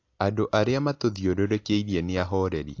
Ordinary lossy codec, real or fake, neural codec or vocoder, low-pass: AAC, 48 kbps; real; none; 7.2 kHz